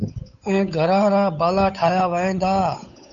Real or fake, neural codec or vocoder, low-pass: fake; codec, 16 kHz, 8 kbps, FunCodec, trained on Chinese and English, 25 frames a second; 7.2 kHz